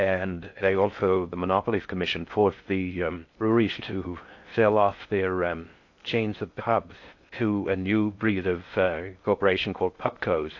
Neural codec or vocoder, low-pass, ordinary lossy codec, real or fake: codec, 16 kHz in and 24 kHz out, 0.6 kbps, FocalCodec, streaming, 4096 codes; 7.2 kHz; AAC, 48 kbps; fake